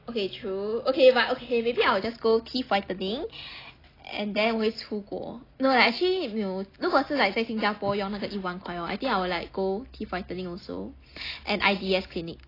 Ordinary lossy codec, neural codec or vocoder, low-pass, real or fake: AAC, 24 kbps; none; 5.4 kHz; real